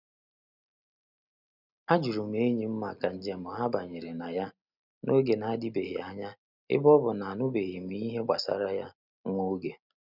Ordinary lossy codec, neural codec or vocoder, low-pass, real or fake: none; none; 5.4 kHz; real